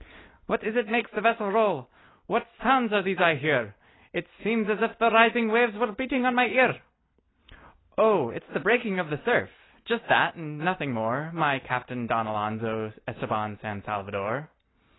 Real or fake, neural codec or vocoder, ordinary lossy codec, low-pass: real; none; AAC, 16 kbps; 7.2 kHz